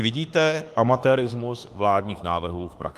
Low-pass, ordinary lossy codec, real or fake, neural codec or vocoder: 14.4 kHz; Opus, 32 kbps; fake; autoencoder, 48 kHz, 32 numbers a frame, DAC-VAE, trained on Japanese speech